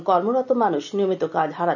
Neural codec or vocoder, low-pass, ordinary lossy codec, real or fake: none; 7.2 kHz; none; real